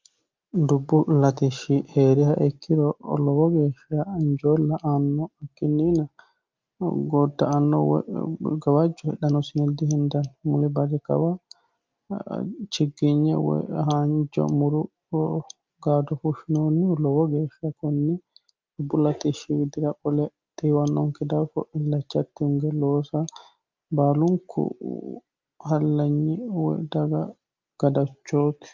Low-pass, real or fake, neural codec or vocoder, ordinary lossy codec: 7.2 kHz; real; none; Opus, 24 kbps